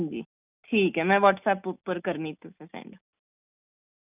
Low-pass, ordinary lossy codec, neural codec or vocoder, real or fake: 3.6 kHz; none; none; real